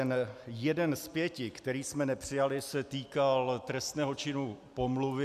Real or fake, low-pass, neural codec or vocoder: real; 14.4 kHz; none